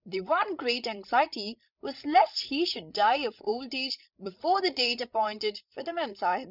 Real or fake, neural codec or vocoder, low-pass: fake; codec, 16 kHz, 16 kbps, FreqCodec, larger model; 5.4 kHz